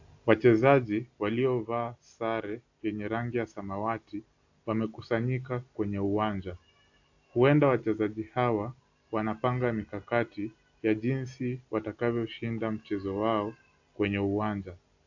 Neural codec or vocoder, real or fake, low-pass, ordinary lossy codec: none; real; 7.2 kHz; AAC, 48 kbps